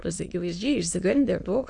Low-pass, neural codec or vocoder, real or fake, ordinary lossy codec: 9.9 kHz; autoencoder, 22.05 kHz, a latent of 192 numbers a frame, VITS, trained on many speakers; fake; AAC, 64 kbps